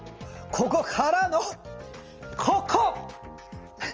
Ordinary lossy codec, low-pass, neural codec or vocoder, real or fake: Opus, 24 kbps; 7.2 kHz; none; real